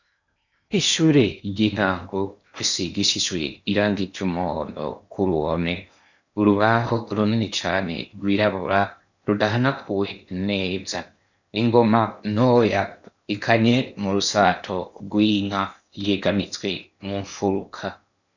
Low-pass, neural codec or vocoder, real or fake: 7.2 kHz; codec, 16 kHz in and 24 kHz out, 0.8 kbps, FocalCodec, streaming, 65536 codes; fake